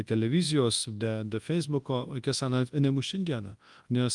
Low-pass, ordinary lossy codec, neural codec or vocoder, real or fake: 10.8 kHz; Opus, 32 kbps; codec, 24 kHz, 0.9 kbps, WavTokenizer, large speech release; fake